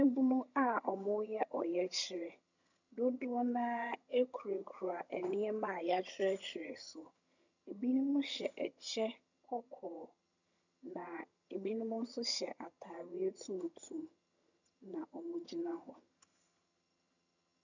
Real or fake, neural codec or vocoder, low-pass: fake; vocoder, 22.05 kHz, 80 mel bands, HiFi-GAN; 7.2 kHz